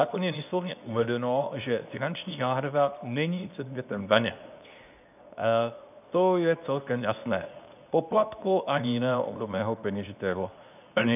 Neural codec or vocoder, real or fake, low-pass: codec, 24 kHz, 0.9 kbps, WavTokenizer, medium speech release version 2; fake; 3.6 kHz